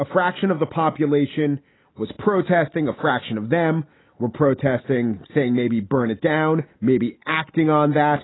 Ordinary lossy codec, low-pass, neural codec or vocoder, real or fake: AAC, 16 kbps; 7.2 kHz; none; real